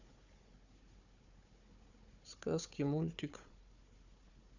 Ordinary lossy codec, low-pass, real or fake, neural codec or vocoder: none; 7.2 kHz; fake; codec, 16 kHz, 4 kbps, FunCodec, trained on Chinese and English, 50 frames a second